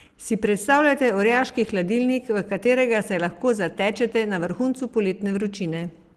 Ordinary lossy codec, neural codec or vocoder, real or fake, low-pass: Opus, 16 kbps; none; real; 14.4 kHz